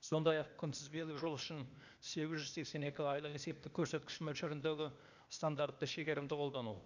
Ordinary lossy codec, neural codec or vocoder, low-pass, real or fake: none; codec, 16 kHz, 0.8 kbps, ZipCodec; 7.2 kHz; fake